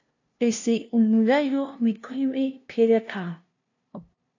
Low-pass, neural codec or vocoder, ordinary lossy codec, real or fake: 7.2 kHz; codec, 16 kHz, 0.5 kbps, FunCodec, trained on LibriTTS, 25 frames a second; AAC, 48 kbps; fake